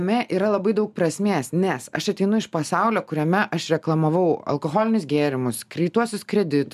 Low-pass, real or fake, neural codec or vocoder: 14.4 kHz; real; none